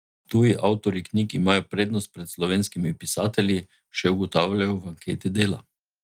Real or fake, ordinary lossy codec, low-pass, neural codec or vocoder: real; Opus, 32 kbps; 19.8 kHz; none